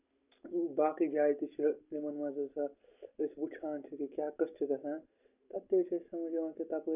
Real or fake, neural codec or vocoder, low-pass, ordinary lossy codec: real; none; 3.6 kHz; none